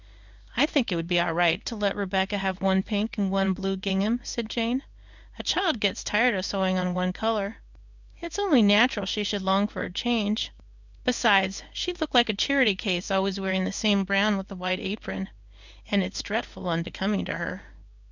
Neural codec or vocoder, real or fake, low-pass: codec, 16 kHz in and 24 kHz out, 1 kbps, XY-Tokenizer; fake; 7.2 kHz